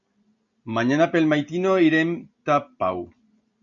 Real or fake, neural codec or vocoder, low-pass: real; none; 7.2 kHz